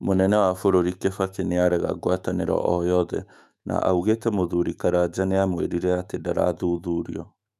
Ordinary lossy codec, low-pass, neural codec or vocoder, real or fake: none; 14.4 kHz; autoencoder, 48 kHz, 128 numbers a frame, DAC-VAE, trained on Japanese speech; fake